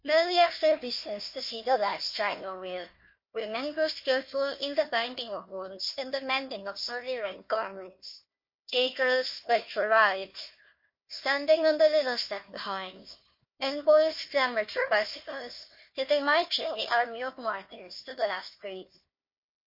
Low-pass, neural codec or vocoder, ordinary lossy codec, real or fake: 5.4 kHz; codec, 16 kHz, 1 kbps, FunCodec, trained on Chinese and English, 50 frames a second; MP3, 32 kbps; fake